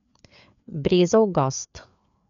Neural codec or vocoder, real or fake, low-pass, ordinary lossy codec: codec, 16 kHz, 4 kbps, FreqCodec, larger model; fake; 7.2 kHz; none